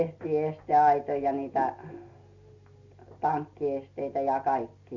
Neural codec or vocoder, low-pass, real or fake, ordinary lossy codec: none; 7.2 kHz; real; Opus, 64 kbps